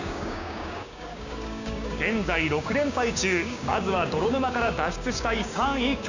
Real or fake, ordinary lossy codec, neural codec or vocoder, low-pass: fake; none; codec, 16 kHz, 6 kbps, DAC; 7.2 kHz